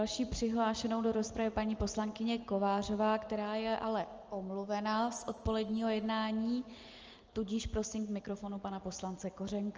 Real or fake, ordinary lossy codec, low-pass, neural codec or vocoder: real; Opus, 24 kbps; 7.2 kHz; none